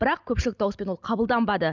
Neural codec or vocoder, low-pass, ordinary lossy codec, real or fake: none; 7.2 kHz; none; real